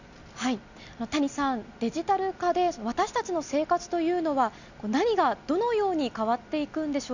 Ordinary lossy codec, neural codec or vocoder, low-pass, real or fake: none; none; 7.2 kHz; real